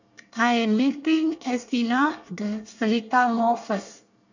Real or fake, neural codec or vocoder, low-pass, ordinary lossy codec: fake; codec, 24 kHz, 1 kbps, SNAC; 7.2 kHz; none